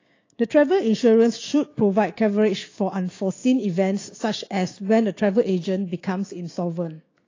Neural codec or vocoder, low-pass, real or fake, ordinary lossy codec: codec, 16 kHz, 6 kbps, DAC; 7.2 kHz; fake; AAC, 32 kbps